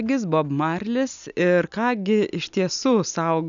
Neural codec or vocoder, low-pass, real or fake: none; 7.2 kHz; real